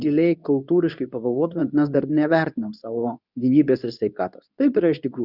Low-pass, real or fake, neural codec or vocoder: 5.4 kHz; fake; codec, 24 kHz, 0.9 kbps, WavTokenizer, medium speech release version 2